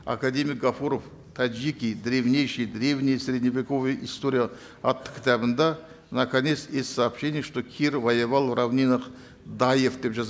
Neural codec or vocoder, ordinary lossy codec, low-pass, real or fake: none; none; none; real